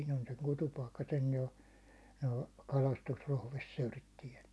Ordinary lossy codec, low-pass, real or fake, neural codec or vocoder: none; none; real; none